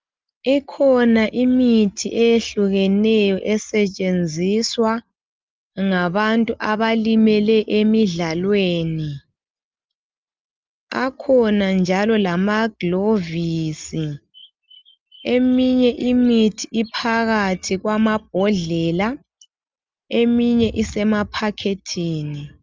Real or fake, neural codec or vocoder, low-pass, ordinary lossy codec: real; none; 7.2 kHz; Opus, 32 kbps